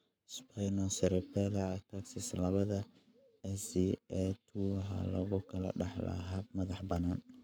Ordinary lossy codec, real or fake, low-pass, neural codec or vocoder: none; fake; none; codec, 44.1 kHz, 7.8 kbps, Pupu-Codec